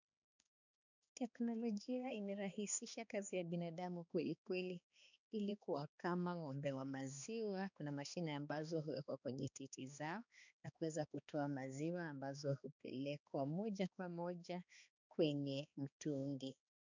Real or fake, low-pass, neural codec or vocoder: fake; 7.2 kHz; codec, 16 kHz, 2 kbps, X-Codec, HuBERT features, trained on balanced general audio